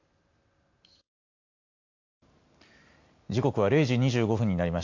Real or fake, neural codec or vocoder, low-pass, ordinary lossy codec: real; none; 7.2 kHz; AAC, 48 kbps